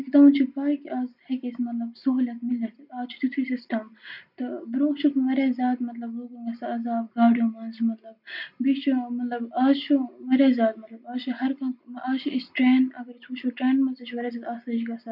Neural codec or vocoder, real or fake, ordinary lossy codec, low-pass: none; real; none; 5.4 kHz